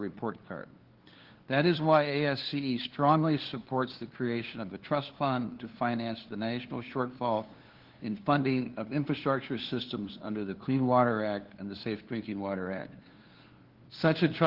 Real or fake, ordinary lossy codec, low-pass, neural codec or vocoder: fake; Opus, 16 kbps; 5.4 kHz; codec, 16 kHz, 2 kbps, FunCodec, trained on LibriTTS, 25 frames a second